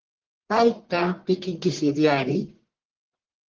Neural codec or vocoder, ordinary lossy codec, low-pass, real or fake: codec, 44.1 kHz, 1.7 kbps, Pupu-Codec; Opus, 16 kbps; 7.2 kHz; fake